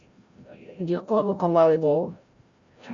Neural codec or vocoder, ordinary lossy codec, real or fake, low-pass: codec, 16 kHz, 0.5 kbps, FreqCodec, larger model; Opus, 64 kbps; fake; 7.2 kHz